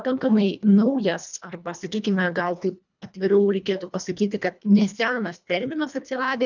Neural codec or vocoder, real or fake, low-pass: codec, 24 kHz, 1.5 kbps, HILCodec; fake; 7.2 kHz